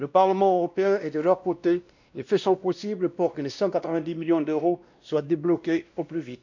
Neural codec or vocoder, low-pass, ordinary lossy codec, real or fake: codec, 16 kHz, 1 kbps, X-Codec, WavLM features, trained on Multilingual LibriSpeech; 7.2 kHz; none; fake